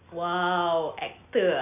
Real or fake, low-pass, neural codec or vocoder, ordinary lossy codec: real; 3.6 kHz; none; none